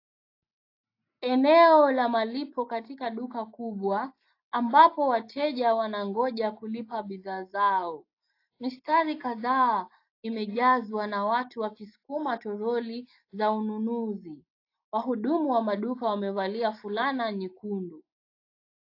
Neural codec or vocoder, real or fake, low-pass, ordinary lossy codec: none; real; 5.4 kHz; AAC, 32 kbps